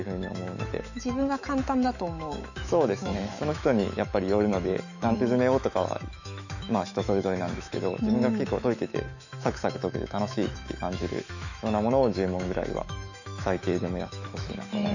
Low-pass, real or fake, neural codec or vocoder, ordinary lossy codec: 7.2 kHz; fake; autoencoder, 48 kHz, 128 numbers a frame, DAC-VAE, trained on Japanese speech; none